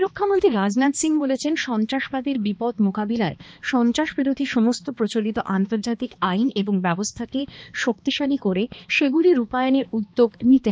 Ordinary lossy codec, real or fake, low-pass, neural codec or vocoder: none; fake; none; codec, 16 kHz, 2 kbps, X-Codec, HuBERT features, trained on balanced general audio